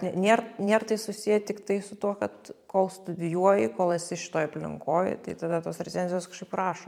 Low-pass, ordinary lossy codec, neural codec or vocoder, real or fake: 19.8 kHz; MP3, 96 kbps; vocoder, 44.1 kHz, 128 mel bands every 512 samples, BigVGAN v2; fake